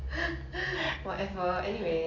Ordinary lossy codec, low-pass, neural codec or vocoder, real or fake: none; 7.2 kHz; none; real